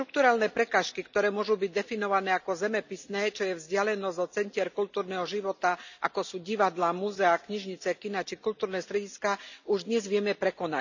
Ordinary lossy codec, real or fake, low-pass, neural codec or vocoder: none; real; 7.2 kHz; none